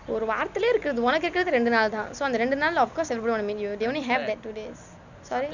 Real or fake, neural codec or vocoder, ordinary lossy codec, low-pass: real; none; none; 7.2 kHz